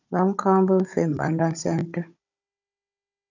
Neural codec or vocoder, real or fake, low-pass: codec, 16 kHz, 16 kbps, FunCodec, trained on Chinese and English, 50 frames a second; fake; 7.2 kHz